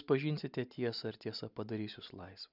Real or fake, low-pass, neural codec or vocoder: real; 5.4 kHz; none